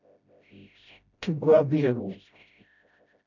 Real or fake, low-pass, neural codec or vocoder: fake; 7.2 kHz; codec, 16 kHz, 0.5 kbps, FreqCodec, smaller model